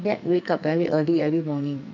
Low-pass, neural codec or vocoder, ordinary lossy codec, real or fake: 7.2 kHz; codec, 44.1 kHz, 2.6 kbps, SNAC; none; fake